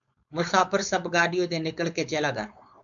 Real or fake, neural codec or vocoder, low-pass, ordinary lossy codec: fake; codec, 16 kHz, 4.8 kbps, FACodec; 7.2 kHz; MP3, 96 kbps